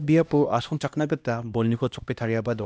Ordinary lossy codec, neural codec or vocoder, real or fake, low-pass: none; codec, 16 kHz, 1 kbps, X-Codec, HuBERT features, trained on LibriSpeech; fake; none